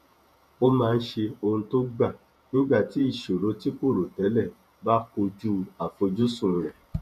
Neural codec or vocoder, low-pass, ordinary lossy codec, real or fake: vocoder, 48 kHz, 128 mel bands, Vocos; 14.4 kHz; none; fake